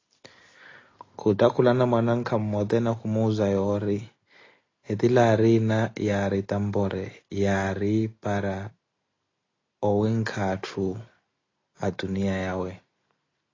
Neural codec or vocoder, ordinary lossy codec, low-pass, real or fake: none; AAC, 32 kbps; 7.2 kHz; real